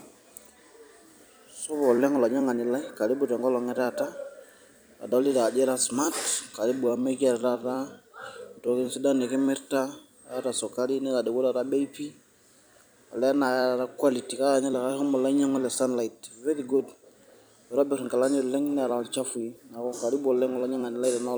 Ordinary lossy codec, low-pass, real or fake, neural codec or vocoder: none; none; fake; vocoder, 44.1 kHz, 128 mel bands every 256 samples, BigVGAN v2